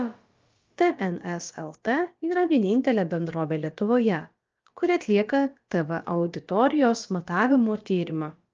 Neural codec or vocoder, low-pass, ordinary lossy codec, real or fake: codec, 16 kHz, about 1 kbps, DyCAST, with the encoder's durations; 7.2 kHz; Opus, 24 kbps; fake